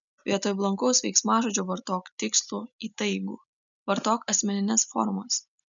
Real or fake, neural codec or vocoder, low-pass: real; none; 7.2 kHz